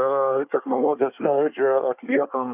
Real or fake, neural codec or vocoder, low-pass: fake; codec, 24 kHz, 1 kbps, SNAC; 3.6 kHz